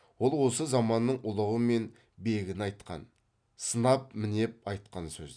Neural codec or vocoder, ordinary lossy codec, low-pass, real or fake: none; none; 9.9 kHz; real